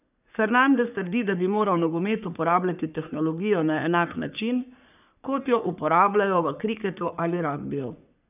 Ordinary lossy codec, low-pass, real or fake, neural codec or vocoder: none; 3.6 kHz; fake; codec, 44.1 kHz, 3.4 kbps, Pupu-Codec